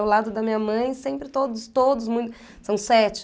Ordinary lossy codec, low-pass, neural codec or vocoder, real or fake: none; none; none; real